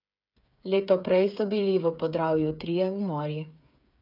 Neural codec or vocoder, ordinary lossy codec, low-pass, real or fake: codec, 16 kHz, 8 kbps, FreqCodec, smaller model; none; 5.4 kHz; fake